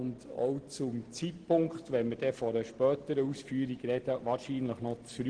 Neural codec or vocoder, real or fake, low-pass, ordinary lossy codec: none; real; 9.9 kHz; Opus, 16 kbps